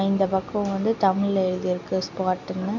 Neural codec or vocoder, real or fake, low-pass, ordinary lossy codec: none; real; 7.2 kHz; none